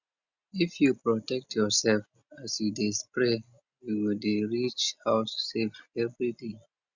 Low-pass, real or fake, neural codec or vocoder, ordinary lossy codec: 7.2 kHz; real; none; Opus, 64 kbps